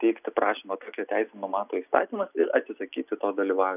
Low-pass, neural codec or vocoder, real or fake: 3.6 kHz; none; real